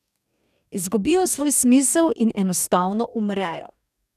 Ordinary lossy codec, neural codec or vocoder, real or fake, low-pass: none; codec, 44.1 kHz, 2.6 kbps, DAC; fake; 14.4 kHz